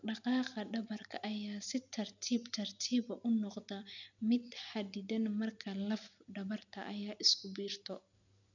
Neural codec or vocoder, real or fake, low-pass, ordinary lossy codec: none; real; 7.2 kHz; none